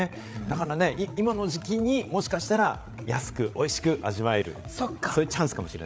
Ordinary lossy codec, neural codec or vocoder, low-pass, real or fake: none; codec, 16 kHz, 8 kbps, FreqCodec, larger model; none; fake